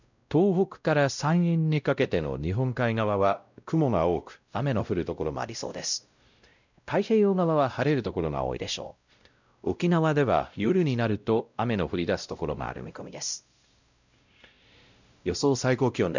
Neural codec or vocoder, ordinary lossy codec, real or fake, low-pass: codec, 16 kHz, 0.5 kbps, X-Codec, WavLM features, trained on Multilingual LibriSpeech; none; fake; 7.2 kHz